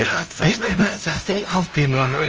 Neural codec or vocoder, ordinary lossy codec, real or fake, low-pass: codec, 16 kHz, 0.5 kbps, FunCodec, trained on LibriTTS, 25 frames a second; Opus, 24 kbps; fake; 7.2 kHz